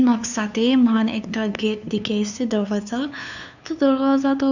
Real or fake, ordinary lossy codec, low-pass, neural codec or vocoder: fake; none; 7.2 kHz; codec, 16 kHz, 2 kbps, FunCodec, trained on LibriTTS, 25 frames a second